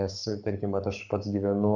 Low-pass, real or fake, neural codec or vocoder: 7.2 kHz; fake; codec, 44.1 kHz, 7.8 kbps, Pupu-Codec